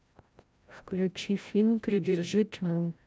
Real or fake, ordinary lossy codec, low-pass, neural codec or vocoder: fake; none; none; codec, 16 kHz, 0.5 kbps, FreqCodec, larger model